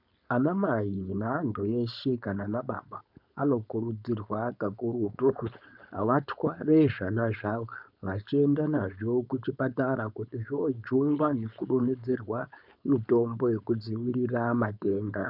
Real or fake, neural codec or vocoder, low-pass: fake; codec, 16 kHz, 4.8 kbps, FACodec; 5.4 kHz